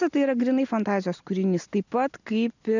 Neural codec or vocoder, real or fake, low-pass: none; real; 7.2 kHz